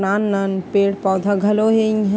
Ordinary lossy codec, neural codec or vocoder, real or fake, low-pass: none; none; real; none